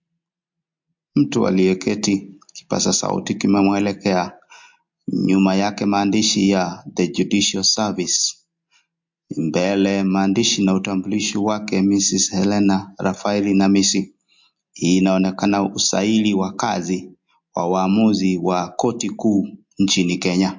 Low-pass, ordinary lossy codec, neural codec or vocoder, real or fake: 7.2 kHz; MP3, 48 kbps; none; real